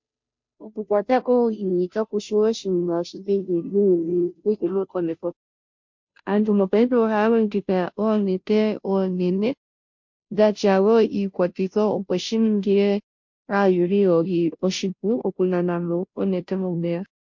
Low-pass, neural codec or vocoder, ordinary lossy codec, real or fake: 7.2 kHz; codec, 16 kHz, 0.5 kbps, FunCodec, trained on Chinese and English, 25 frames a second; MP3, 48 kbps; fake